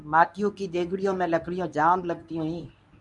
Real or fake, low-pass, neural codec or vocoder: fake; 10.8 kHz; codec, 24 kHz, 0.9 kbps, WavTokenizer, medium speech release version 1